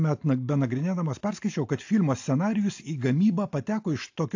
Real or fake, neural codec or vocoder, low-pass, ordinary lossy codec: real; none; 7.2 kHz; AAC, 48 kbps